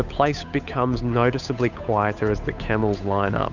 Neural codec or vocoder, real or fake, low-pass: codec, 16 kHz, 8 kbps, FunCodec, trained on Chinese and English, 25 frames a second; fake; 7.2 kHz